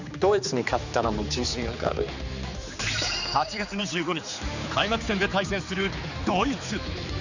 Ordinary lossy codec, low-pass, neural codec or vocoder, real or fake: none; 7.2 kHz; codec, 16 kHz, 4 kbps, X-Codec, HuBERT features, trained on general audio; fake